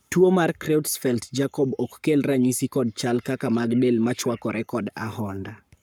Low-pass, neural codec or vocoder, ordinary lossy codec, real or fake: none; codec, 44.1 kHz, 7.8 kbps, Pupu-Codec; none; fake